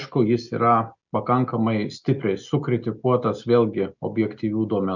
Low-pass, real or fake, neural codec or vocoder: 7.2 kHz; real; none